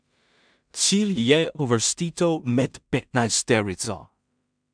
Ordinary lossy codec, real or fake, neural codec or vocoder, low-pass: MP3, 96 kbps; fake; codec, 16 kHz in and 24 kHz out, 0.4 kbps, LongCat-Audio-Codec, two codebook decoder; 9.9 kHz